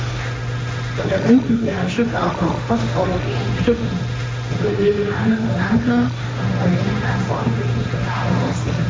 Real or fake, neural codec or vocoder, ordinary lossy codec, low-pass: fake; codec, 16 kHz, 1.1 kbps, Voila-Tokenizer; none; none